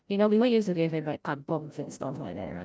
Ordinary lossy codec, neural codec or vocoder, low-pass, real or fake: none; codec, 16 kHz, 0.5 kbps, FreqCodec, larger model; none; fake